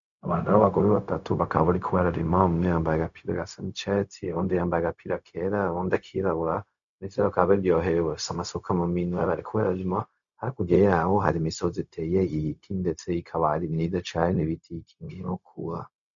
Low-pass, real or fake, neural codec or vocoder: 7.2 kHz; fake; codec, 16 kHz, 0.4 kbps, LongCat-Audio-Codec